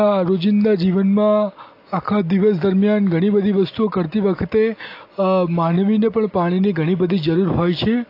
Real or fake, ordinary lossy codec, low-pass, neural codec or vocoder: real; AAC, 32 kbps; 5.4 kHz; none